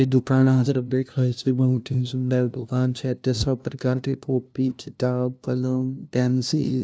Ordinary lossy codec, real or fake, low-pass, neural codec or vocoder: none; fake; none; codec, 16 kHz, 0.5 kbps, FunCodec, trained on LibriTTS, 25 frames a second